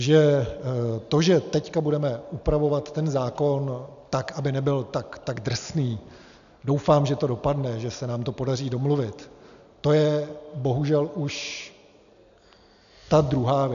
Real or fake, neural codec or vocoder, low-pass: real; none; 7.2 kHz